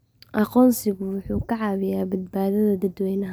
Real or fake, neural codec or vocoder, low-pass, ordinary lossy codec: real; none; none; none